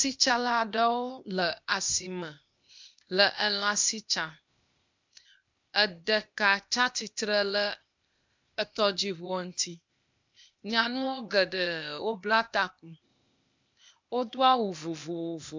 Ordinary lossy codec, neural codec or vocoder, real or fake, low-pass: MP3, 64 kbps; codec, 16 kHz, 0.8 kbps, ZipCodec; fake; 7.2 kHz